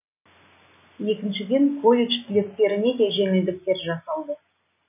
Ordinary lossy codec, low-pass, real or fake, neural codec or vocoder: none; 3.6 kHz; real; none